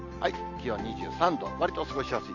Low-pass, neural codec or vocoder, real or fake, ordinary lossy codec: 7.2 kHz; none; real; none